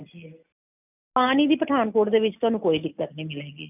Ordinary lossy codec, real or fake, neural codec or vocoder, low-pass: none; real; none; 3.6 kHz